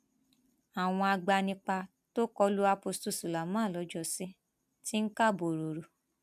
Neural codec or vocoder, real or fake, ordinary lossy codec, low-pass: none; real; AAC, 96 kbps; 14.4 kHz